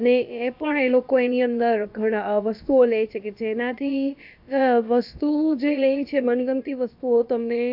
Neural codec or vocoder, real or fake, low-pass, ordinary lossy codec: codec, 16 kHz, about 1 kbps, DyCAST, with the encoder's durations; fake; 5.4 kHz; AAC, 48 kbps